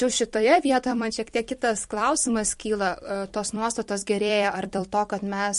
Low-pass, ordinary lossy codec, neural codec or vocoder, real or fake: 14.4 kHz; MP3, 48 kbps; vocoder, 44.1 kHz, 128 mel bands every 256 samples, BigVGAN v2; fake